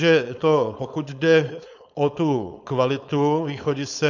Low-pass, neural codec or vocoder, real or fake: 7.2 kHz; codec, 16 kHz, 4.8 kbps, FACodec; fake